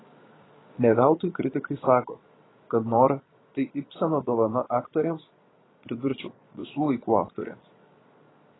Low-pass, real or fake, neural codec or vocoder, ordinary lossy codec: 7.2 kHz; fake; vocoder, 22.05 kHz, 80 mel bands, Vocos; AAC, 16 kbps